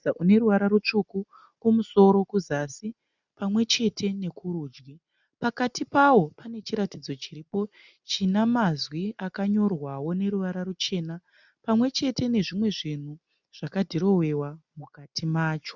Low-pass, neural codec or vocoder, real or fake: 7.2 kHz; none; real